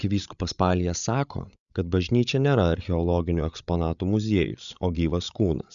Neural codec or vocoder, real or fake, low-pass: codec, 16 kHz, 8 kbps, FreqCodec, larger model; fake; 7.2 kHz